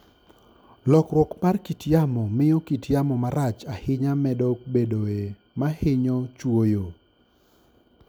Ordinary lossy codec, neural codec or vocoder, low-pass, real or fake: none; none; none; real